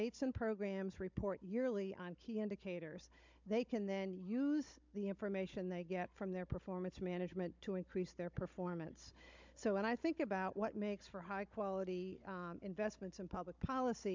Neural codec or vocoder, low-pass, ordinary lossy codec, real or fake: codec, 16 kHz, 16 kbps, FunCodec, trained on Chinese and English, 50 frames a second; 7.2 kHz; MP3, 64 kbps; fake